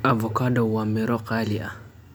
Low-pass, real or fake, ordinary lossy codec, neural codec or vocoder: none; real; none; none